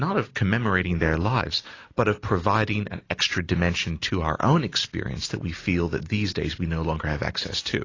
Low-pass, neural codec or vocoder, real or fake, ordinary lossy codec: 7.2 kHz; none; real; AAC, 32 kbps